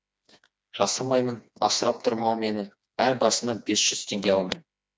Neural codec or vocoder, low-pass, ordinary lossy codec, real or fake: codec, 16 kHz, 2 kbps, FreqCodec, smaller model; none; none; fake